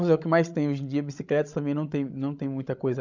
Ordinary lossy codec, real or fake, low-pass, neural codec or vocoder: none; fake; 7.2 kHz; codec, 16 kHz, 16 kbps, FreqCodec, larger model